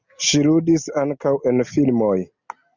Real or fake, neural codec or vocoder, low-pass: real; none; 7.2 kHz